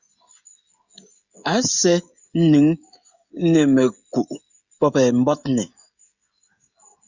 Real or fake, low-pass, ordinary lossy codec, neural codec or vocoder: fake; 7.2 kHz; Opus, 64 kbps; codec, 16 kHz, 16 kbps, FreqCodec, smaller model